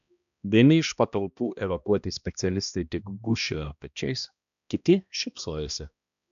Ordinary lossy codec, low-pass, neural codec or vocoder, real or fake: MP3, 96 kbps; 7.2 kHz; codec, 16 kHz, 1 kbps, X-Codec, HuBERT features, trained on balanced general audio; fake